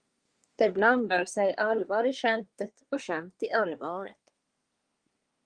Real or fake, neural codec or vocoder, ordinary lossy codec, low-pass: fake; codec, 24 kHz, 1 kbps, SNAC; Opus, 32 kbps; 9.9 kHz